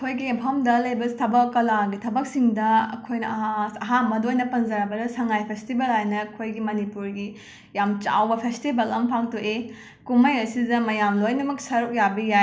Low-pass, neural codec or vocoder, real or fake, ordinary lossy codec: none; none; real; none